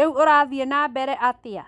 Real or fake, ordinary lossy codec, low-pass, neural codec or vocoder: real; none; 10.8 kHz; none